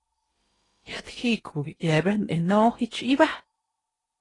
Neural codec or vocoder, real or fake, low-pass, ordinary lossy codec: codec, 16 kHz in and 24 kHz out, 0.8 kbps, FocalCodec, streaming, 65536 codes; fake; 10.8 kHz; AAC, 32 kbps